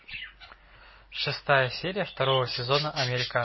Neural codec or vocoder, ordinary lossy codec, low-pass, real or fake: none; MP3, 24 kbps; 7.2 kHz; real